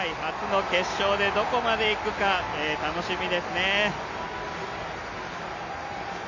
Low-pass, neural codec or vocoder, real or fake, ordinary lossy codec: 7.2 kHz; none; real; none